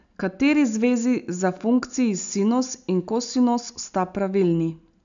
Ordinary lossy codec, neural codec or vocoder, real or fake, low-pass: none; none; real; 7.2 kHz